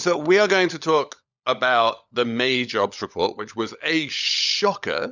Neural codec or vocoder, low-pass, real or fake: codec, 16 kHz, 16 kbps, FunCodec, trained on LibriTTS, 50 frames a second; 7.2 kHz; fake